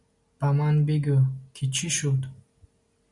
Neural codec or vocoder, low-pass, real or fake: none; 10.8 kHz; real